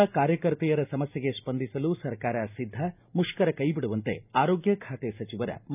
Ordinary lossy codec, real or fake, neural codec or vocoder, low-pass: none; real; none; 3.6 kHz